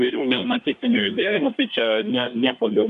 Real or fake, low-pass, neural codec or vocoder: fake; 10.8 kHz; codec, 24 kHz, 1 kbps, SNAC